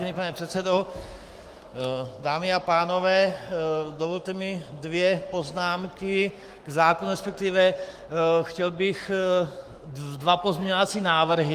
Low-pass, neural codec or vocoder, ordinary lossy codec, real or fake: 14.4 kHz; codec, 44.1 kHz, 7.8 kbps, Pupu-Codec; Opus, 32 kbps; fake